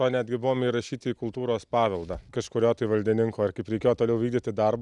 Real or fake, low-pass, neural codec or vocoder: real; 10.8 kHz; none